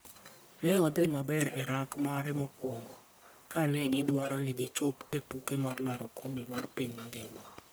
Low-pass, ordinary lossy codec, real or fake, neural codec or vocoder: none; none; fake; codec, 44.1 kHz, 1.7 kbps, Pupu-Codec